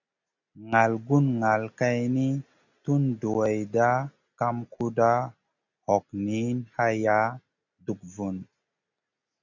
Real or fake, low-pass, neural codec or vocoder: real; 7.2 kHz; none